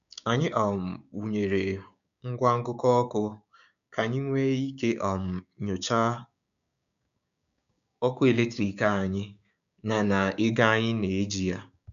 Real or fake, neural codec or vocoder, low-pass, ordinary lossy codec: fake; codec, 16 kHz, 6 kbps, DAC; 7.2 kHz; none